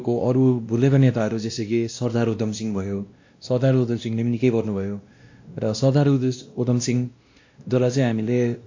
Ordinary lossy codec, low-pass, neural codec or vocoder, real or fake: AAC, 48 kbps; 7.2 kHz; codec, 16 kHz, 1 kbps, X-Codec, WavLM features, trained on Multilingual LibriSpeech; fake